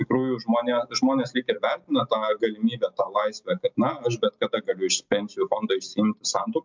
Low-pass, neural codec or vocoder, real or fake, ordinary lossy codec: 7.2 kHz; none; real; MP3, 64 kbps